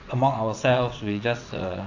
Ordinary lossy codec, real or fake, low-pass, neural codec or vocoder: AAC, 48 kbps; fake; 7.2 kHz; vocoder, 22.05 kHz, 80 mel bands, WaveNeXt